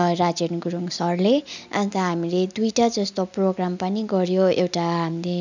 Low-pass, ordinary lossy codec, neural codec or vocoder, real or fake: 7.2 kHz; none; none; real